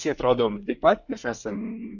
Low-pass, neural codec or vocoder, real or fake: 7.2 kHz; codec, 24 kHz, 1 kbps, SNAC; fake